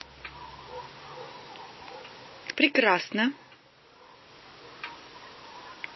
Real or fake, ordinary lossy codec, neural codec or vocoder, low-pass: real; MP3, 24 kbps; none; 7.2 kHz